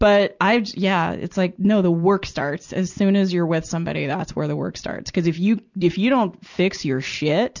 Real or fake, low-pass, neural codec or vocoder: real; 7.2 kHz; none